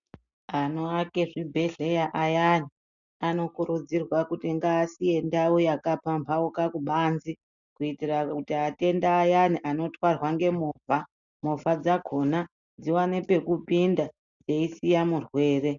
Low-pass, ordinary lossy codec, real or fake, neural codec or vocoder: 7.2 kHz; MP3, 96 kbps; real; none